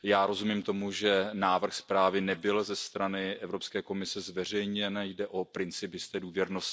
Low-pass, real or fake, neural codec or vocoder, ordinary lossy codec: none; real; none; none